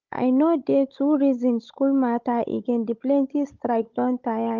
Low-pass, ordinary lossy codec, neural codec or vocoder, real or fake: 7.2 kHz; Opus, 24 kbps; codec, 16 kHz, 16 kbps, FunCodec, trained on Chinese and English, 50 frames a second; fake